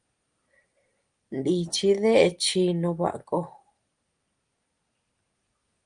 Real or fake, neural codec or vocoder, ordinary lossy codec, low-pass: real; none; Opus, 32 kbps; 9.9 kHz